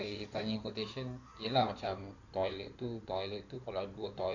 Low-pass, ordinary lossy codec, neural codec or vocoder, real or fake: 7.2 kHz; none; codec, 16 kHz in and 24 kHz out, 2.2 kbps, FireRedTTS-2 codec; fake